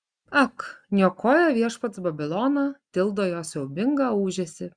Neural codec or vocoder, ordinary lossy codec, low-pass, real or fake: none; Opus, 64 kbps; 9.9 kHz; real